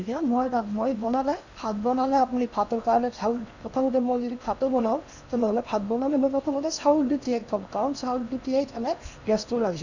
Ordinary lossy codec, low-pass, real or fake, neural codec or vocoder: none; 7.2 kHz; fake; codec, 16 kHz in and 24 kHz out, 0.8 kbps, FocalCodec, streaming, 65536 codes